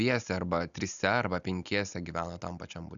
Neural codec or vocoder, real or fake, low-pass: none; real; 7.2 kHz